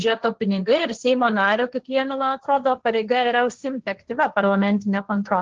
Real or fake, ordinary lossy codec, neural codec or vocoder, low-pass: fake; Opus, 16 kbps; codec, 16 kHz, 1.1 kbps, Voila-Tokenizer; 7.2 kHz